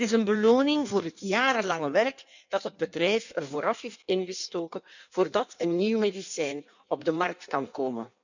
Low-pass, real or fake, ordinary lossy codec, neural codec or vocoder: 7.2 kHz; fake; none; codec, 16 kHz in and 24 kHz out, 1.1 kbps, FireRedTTS-2 codec